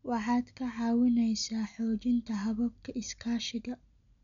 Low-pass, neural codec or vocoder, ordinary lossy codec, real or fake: 7.2 kHz; none; none; real